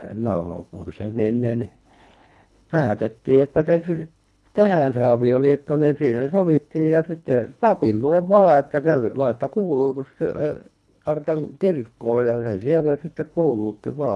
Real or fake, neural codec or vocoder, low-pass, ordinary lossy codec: fake; codec, 24 kHz, 1.5 kbps, HILCodec; none; none